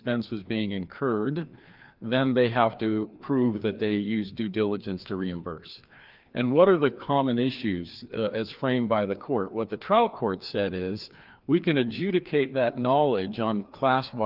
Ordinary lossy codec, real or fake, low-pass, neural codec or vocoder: Opus, 32 kbps; fake; 5.4 kHz; codec, 16 kHz, 2 kbps, FreqCodec, larger model